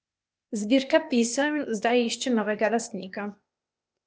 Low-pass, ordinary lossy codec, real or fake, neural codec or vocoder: none; none; fake; codec, 16 kHz, 0.8 kbps, ZipCodec